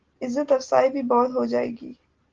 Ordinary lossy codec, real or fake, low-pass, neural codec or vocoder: Opus, 16 kbps; real; 7.2 kHz; none